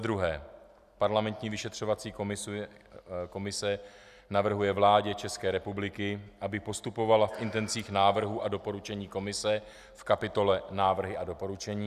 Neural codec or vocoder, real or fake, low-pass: none; real; 14.4 kHz